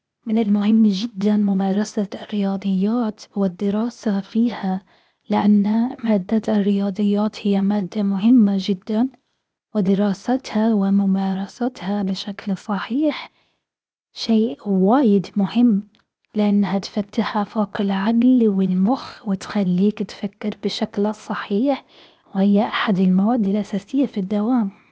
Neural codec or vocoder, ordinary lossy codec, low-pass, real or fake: codec, 16 kHz, 0.8 kbps, ZipCodec; none; none; fake